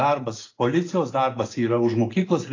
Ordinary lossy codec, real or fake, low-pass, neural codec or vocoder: AAC, 32 kbps; real; 7.2 kHz; none